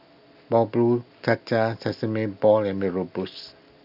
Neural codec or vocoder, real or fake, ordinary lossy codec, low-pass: none; real; none; 5.4 kHz